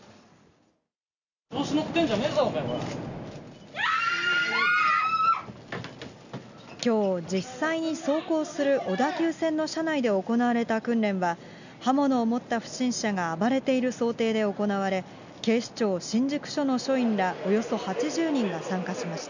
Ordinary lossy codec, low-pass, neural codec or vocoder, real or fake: none; 7.2 kHz; none; real